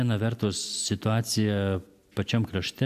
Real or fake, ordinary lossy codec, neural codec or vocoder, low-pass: real; MP3, 96 kbps; none; 14.4 kHz